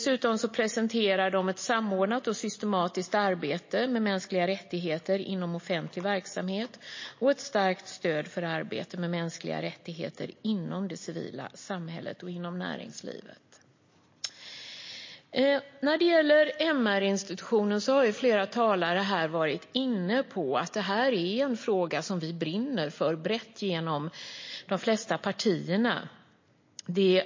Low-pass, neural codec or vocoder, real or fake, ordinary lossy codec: 7.2 kHz; none; real; MP3, 32 kbps